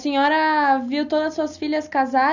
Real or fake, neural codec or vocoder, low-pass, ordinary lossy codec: real; none; 7.2 kHz; none